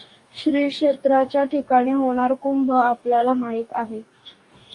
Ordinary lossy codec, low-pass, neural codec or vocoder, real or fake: Opus, 64 kbps; 10.8 kHz; codec, 44.1 kHz, 2.6 kbps, DAC; fake